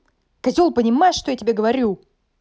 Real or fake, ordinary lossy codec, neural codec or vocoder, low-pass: real; none; none; none